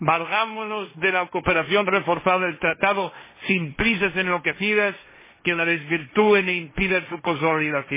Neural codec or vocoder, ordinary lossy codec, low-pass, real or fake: codec, 16 kHz, 1.1 kbps, Voila-Tokenizer; MP3, 16 kbps; 3.6 kHz; fake